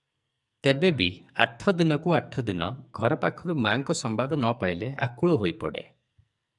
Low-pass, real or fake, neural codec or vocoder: 10.8 kHz; fake; codec, 44.1 kHz, 2.6 kbps, SNAC